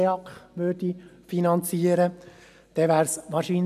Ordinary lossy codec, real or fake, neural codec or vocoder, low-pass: none; real; none; 14.4 kHz